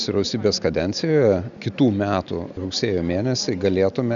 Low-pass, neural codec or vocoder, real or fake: 7.2 kHz; none; real